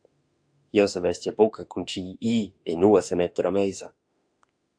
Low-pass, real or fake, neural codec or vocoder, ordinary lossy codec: 9.9 kHz; fake; autoencoder, 48 kHz, 32 numbers a frame, DAC-VAE, trained on Japanese speech; AAC, 64 kbps